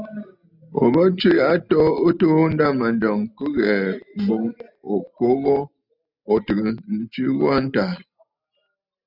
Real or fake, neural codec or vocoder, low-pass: real; none; 5.4 kHz